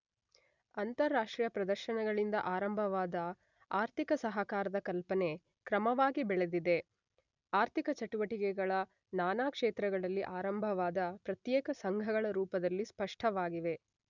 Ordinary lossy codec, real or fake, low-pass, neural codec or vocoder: none; real; 7.2 kHz; none